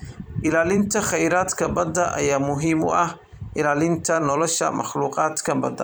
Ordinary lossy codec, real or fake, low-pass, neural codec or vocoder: none; fake; none; vocoder, 44.1 kHz, 128 mel bands every 256 samples, BigVGAN v2